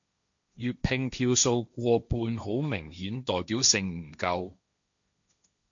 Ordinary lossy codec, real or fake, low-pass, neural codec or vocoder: MP3, 64 kbps; fake; 7.2 kHz; codec, 16 kHz, 1.1 kbps, Voila-Tokenizer